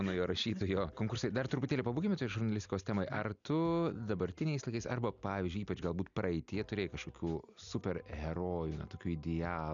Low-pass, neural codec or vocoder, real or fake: 7.2 kHz; none; real